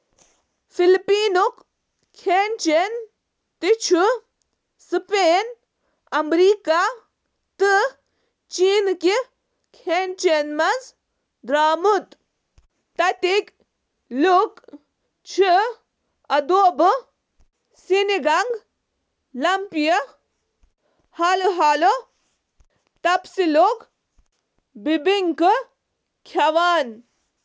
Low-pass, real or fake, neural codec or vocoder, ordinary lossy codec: none; real; none; none